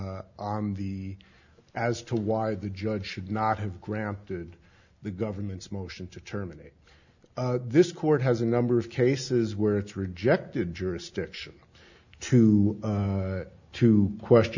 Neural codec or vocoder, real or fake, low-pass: none; real; 7.2 kHz